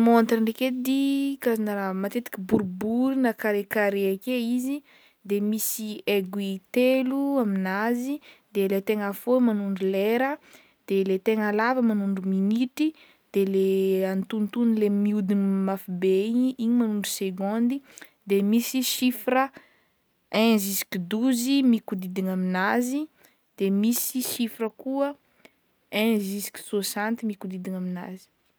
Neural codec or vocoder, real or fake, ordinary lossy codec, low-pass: none; real; none; none